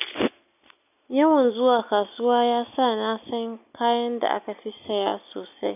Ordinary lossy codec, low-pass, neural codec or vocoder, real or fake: none; 3.6 kHz; none; real